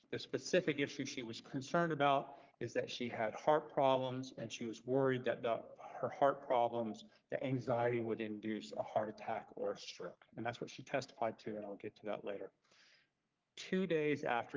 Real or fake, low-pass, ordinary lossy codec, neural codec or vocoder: fake; 7.2 kHz; Opus, 32 kbps; codec, 44.1 kHz, 3.4 kbps, Pupu-Codec